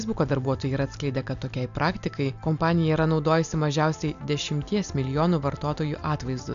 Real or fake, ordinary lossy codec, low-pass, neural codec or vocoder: real; MP3, 96 kbps; 7.2 kHz; none